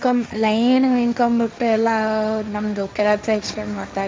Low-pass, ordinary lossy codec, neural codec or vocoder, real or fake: none; none; codec, 16 kHz, 1.1 kbps, Voila-Tokenizer; fake